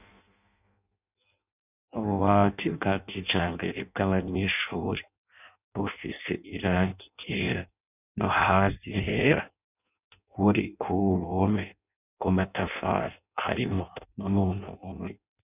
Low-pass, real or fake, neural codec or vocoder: 3.6 kHz; fake; codec, 16 kHz in and 24 kHz out, 0.6 kbps, FireRedTTS-2 codec